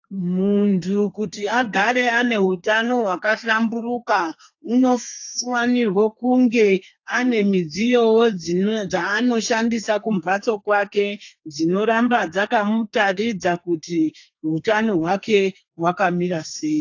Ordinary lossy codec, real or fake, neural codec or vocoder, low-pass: AAC, 48 kbps; fake; codec, 32 kHz, 1.9 kbps, SNAC; 7.2 kHz